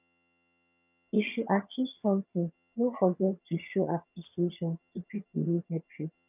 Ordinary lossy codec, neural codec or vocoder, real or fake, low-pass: none; vocoder, 22.05 kHz, 80 mel bands, HiFi-GAN; fake; 3.6 kHz